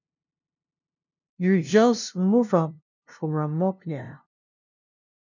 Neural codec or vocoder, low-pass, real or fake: codec, 16 kHz, 0.5 kbps, FunCodec, trained on LibriTTS, 25 frames a second; 7.2 kHz; fake